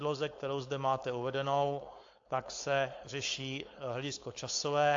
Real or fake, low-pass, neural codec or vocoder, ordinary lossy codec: fake; 7.2 kHz; codec, 16 kHz, 4.8 kbps, FACodec; AAC, 48 kbps